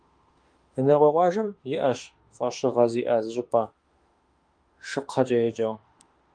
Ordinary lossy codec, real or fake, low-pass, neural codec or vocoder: Opus, 32 kbps; fake; 9.9 kHz; autoencoder, 48 kHz, 32 numbers a frame, DAC-VAE, trained on Japanese speech